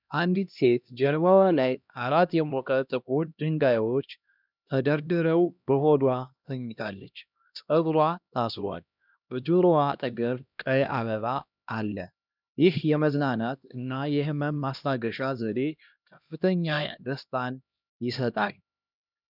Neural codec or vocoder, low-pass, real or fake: codec, 16 kHz, 1 kbps, X-Codec, HuBERT features, trained on LibriSpeech; 5.4 kHz; fake